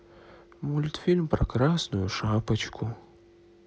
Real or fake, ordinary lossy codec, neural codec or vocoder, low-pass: real; none; none; none